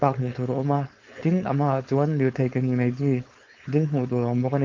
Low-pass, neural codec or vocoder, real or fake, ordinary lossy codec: 7.2 kHz; codec, 16 kHz, 4.8 kbps, FACodec; fake; Opus, 32 kbps